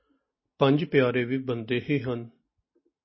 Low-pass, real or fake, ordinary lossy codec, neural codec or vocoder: 7.2 kHz; real; MP3, 24 kbps; none